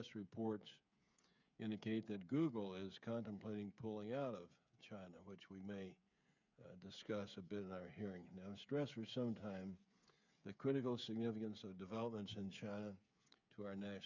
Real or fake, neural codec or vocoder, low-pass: fake; codec, 16 kHz, 8 kbps, FreqCodec, smaller model; 7.2 kHz